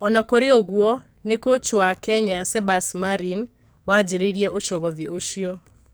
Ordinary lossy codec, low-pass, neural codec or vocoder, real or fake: none; none; codec, 44.1 kHz, 2.6 kbps, SNAC; fake